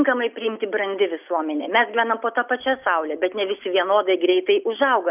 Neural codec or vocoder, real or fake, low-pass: none; real; 3.6 kHz